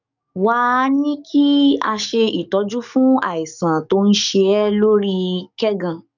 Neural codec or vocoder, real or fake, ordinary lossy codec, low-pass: codec, 44.1 kHz, 7.8 kbps, DAC; fake; none; 7.2 kHz